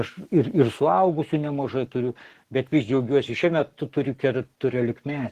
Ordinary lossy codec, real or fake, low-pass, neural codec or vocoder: Opus, 16 kbps; fake; 14.4 kHz; codec, 44.1 kHz, 7.8 kbps, Pupu-Codec